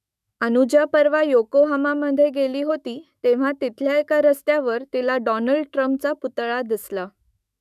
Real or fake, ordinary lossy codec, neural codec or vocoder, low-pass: fake; none; autoencoder, 48 kHz, 128 numbers a frame, DAC-VAE, trained on Japanese speech; 14.4 kHz